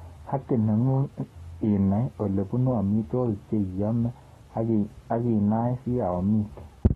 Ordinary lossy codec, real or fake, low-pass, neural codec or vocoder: AAC, 32 kbps; fake; 19.8 kHz; codec, 44.1 kHz, 7.8 kbps, Pupu-Codec